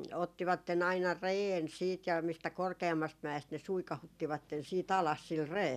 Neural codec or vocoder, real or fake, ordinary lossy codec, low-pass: none; real; none; 14.4 kHz